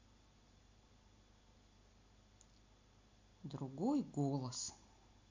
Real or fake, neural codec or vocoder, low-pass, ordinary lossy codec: real; none; 7.2 kHz; MP3, 64 kbps